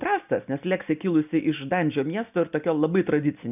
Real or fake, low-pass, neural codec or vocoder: real; 3.6 kHz; none